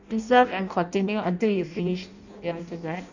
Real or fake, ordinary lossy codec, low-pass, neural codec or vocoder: fake; none; 7.2 kHz; codec, 16 kHz in and 24 kHz out, 0.6 kbps, FireRedTTS-2 codec